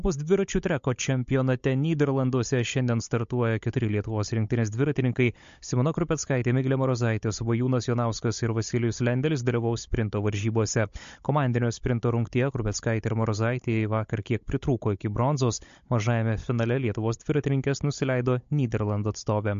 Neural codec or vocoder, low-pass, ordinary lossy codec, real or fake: codec, 16 kHz, 16 kbps, FunCodec, trained on Chinese and English, 50 frames a second; 7.2 kHz; MP3, 48 kbps; fake